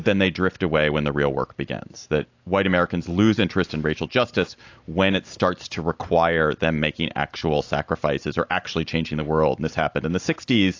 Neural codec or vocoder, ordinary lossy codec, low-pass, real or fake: none; AAC, 48 kbps; 7.2 kHz; real